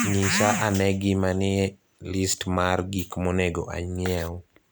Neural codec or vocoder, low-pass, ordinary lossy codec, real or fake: none; none; none; real